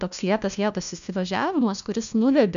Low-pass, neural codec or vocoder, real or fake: 7.2 kHz; codec, 16 kHz, 1 kbps, FunCodec, trained on LibriTTS, 50 frames a second; fake